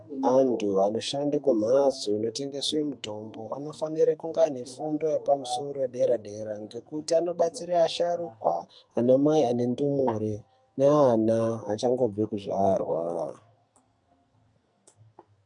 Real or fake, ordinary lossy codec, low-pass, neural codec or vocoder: fake; MP3, 64 kbps; 10.8 kHz; codec, 44.1 kHz, 2.6 kbps, SNAC